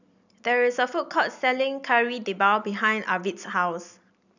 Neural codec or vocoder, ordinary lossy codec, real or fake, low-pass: none; none; real; 7.2 kHz